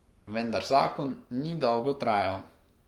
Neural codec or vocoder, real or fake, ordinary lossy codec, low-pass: codec, 44.1 kHz, 7.8 kbps, Pupu-Codec; fake; Opus, 32 kbps; 19.8 kHz